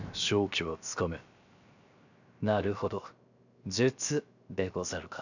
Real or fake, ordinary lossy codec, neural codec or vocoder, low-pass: fake; none; codec, 16 kHz, 0.7 kbps, FocalCodec; 7.2 kHz